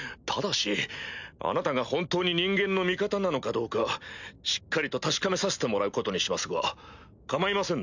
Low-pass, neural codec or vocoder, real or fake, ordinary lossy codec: 7.2 kHz; none; real; none